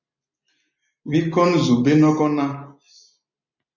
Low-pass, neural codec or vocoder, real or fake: 7.2 kHz; none; real